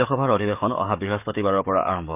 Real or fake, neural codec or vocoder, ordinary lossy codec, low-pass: fake; codec, 44.1 kHz, 7.8 kbps, DAC; none; 3.6 kHz